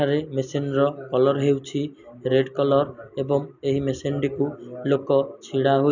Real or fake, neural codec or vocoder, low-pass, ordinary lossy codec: real; none; 7.2 kHz; none